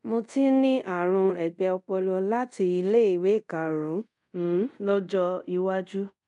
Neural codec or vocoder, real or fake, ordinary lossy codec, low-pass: codec, 24 kHz, 0.5 kbps, DualCodec; fake; none; 10.8 kHz